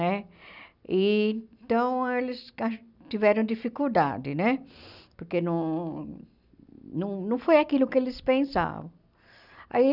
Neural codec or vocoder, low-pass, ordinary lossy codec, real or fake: none; 5.4 kHz; none; real